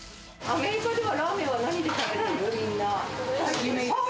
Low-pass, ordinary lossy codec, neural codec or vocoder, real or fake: none; none; none; real